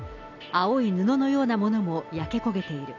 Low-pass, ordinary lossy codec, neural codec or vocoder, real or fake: 7.2 kHz; none; none; real